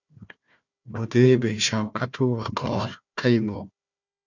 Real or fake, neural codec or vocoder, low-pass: fake; codec, 16 kHz, 1 kbps, FunCodec, trained on Chinese and English, 50 frames a second; 7.2 kHz